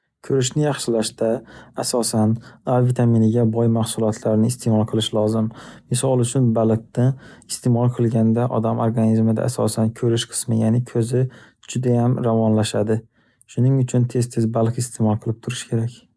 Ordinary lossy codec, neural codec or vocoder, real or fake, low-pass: none; none; real; none